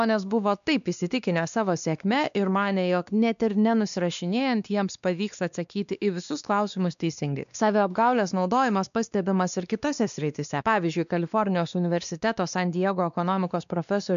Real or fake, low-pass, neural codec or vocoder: fake; 7.2 kHz; codec, 16 kHz, 2 kbps, X-Codec, WavLM features, trained on Multilingual LibriSpeech